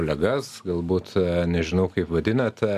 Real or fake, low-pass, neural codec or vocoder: real; 14.4 kHz; none